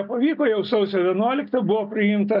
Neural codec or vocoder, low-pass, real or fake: none; 5.4 kHz; real